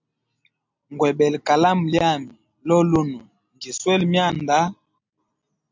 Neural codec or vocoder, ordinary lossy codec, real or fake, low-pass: none; MP3, 64 kbps; real; 7.2 kHz